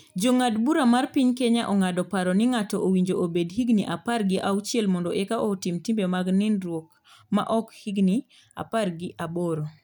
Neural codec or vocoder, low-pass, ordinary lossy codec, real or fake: none; none; none; real